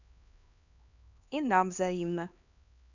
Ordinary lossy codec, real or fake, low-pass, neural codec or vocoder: none; fake; 7.2 kHz; codec, 16 kHz, 2 kbps, X-Codec, HuBERT features, trained on LibriSpeech